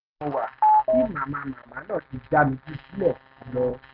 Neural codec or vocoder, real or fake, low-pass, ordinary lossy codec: none; real; 5.4 kHz; none